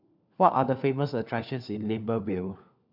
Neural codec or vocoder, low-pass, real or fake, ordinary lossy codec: codec, 16 kHz, 4 kbps, FunCodec, trained on LibriTTS, 50 frames a second; 5.4 kHz; fake; none